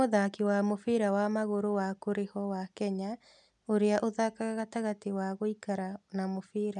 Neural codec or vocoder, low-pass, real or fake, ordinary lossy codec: none; 10.8 kHz; real; none